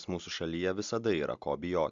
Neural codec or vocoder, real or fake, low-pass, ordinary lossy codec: none; real; 7.2 kHz; Opus, 64 kbps